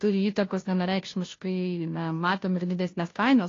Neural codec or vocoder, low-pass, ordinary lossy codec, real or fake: codec, 16 kHz, 0.5 kbps, FunCodec, trained on Chinese and English, 25 frames a second; 7.2 kHz; AAC, 32 kbps; fake